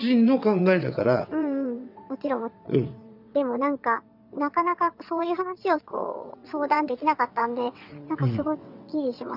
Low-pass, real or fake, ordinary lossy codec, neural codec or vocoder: 5.4 kHz; fake; none; vocoder, 22.05 kHz, 80 mel bands, WaveNeXt